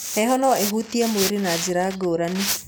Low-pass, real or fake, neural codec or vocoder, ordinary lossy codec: none; real; none; none